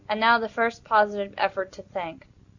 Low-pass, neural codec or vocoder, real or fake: 7.2 kHz; none; real